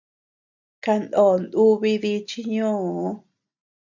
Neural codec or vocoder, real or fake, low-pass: none; real; 7.2 kHz